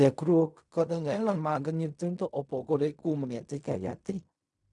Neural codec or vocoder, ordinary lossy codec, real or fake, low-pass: codec, 16 kHz in and 24 kHz out, 0.4 kbps, LongCat-Audio-Codec, fine tuned four codebook decoder; none; fake; 10.8 kHz